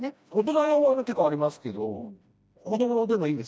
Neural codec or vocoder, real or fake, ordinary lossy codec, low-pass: codec, 16 kHz, 1 kbps, FreqCodec, smaller model; fake; none; none